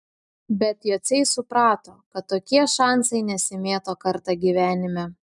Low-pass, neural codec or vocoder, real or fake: 10.8 kHz; none; real